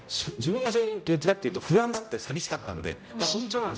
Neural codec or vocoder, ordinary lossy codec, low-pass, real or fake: codec, 16 kHz, 0.5 kbps, X-Codec, HuBERT features, trained on general audio; none; none; fake